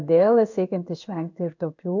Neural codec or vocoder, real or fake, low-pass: codec, 16 kHz in and 24 kHz out, 1 kbps, XY-Tokenizer; fake; 7.2 kHz